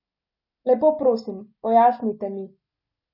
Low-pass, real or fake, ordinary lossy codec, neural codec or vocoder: 5.4 kHz; real; none; none